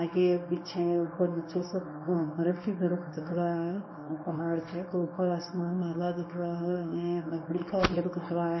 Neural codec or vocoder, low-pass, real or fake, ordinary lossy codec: codec, 24 kHz, 0.9 kbps, WavTokenizer, small release; 7.2 kHz; fake; MP3, 24 kbps